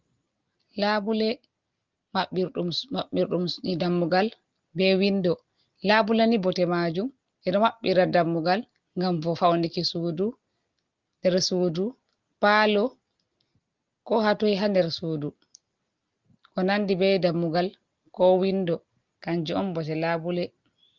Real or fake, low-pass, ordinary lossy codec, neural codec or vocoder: real; 7.2 kHz; Opus, 32 kbps; none